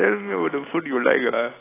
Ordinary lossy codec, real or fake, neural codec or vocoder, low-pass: AAC, 16 kbps; real; none; 3.6 kHz